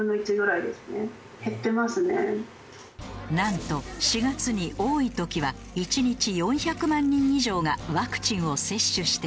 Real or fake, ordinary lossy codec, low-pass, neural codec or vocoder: real; none; none; none